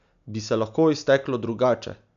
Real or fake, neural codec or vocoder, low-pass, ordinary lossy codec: real; none; 7.2 kHz; none